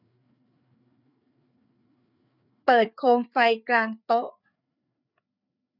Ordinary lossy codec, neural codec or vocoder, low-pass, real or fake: none; codec, 16 kHz, 4 kbps, FreqCodec, larger model; 5.4 kHz; fake